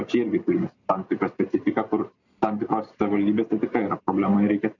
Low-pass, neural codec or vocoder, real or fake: 7.2 kHz; none; real